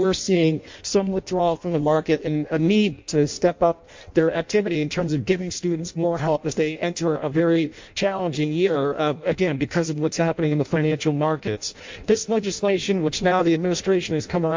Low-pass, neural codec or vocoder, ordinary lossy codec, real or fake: 7.2 kHz; codec, 16 kHz in and 24 kHz out, 0.6 kbps, FireRedTTS-2 codec; MP3, 48 kbps; fake